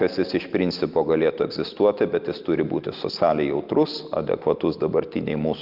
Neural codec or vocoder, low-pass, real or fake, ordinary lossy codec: none; 5.4 kHz; real; Opus, 24 kbps